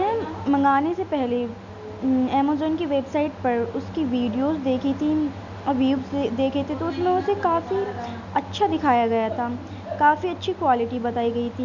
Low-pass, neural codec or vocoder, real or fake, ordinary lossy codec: 7.2 kHz; none; real; none